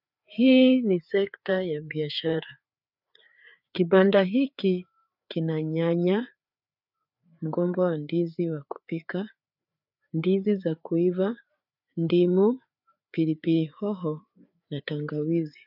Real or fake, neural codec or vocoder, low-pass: fake; codec, 16 kHz, 4 kbps, FreqCodec, larger model; 5.4 kHz